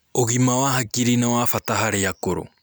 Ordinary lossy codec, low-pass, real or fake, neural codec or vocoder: none; none; real; none